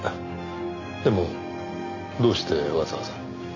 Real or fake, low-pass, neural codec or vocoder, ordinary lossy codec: real; 7.2 kHz; none; none